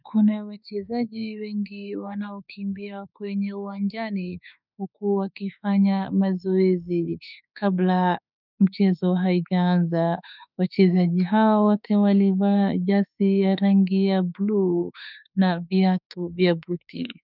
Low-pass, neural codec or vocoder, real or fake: 5.4 kHz; autoencoder, 48 kHz, 32 numbers a frame, DAC-VAE, trained on Japanese speech; fake